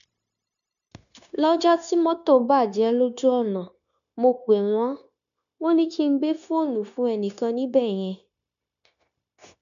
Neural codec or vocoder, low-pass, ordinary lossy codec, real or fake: codec, 16 kHz, 0.9 kbps, LongCat-Audio-Codec; 7.2 kHz; none; fake